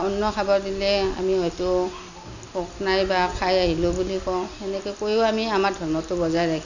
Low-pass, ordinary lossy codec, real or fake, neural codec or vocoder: 7.2 kHz; none; real; none